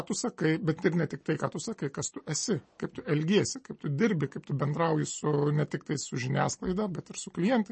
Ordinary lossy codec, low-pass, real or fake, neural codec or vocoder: MP3, 32 kbps; 10.8 kHz; real; none